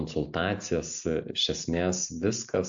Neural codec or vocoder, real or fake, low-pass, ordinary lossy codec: none; real; 7.2 kHz; AAC, 64 kbps